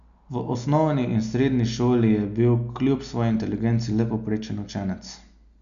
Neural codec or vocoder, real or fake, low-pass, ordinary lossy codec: none; real; 7.2 kHz; none